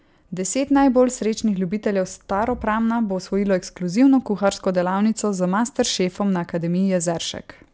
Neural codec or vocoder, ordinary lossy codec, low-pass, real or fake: none; none; none; real